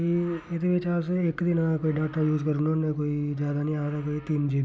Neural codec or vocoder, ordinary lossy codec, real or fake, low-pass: none; none; real; none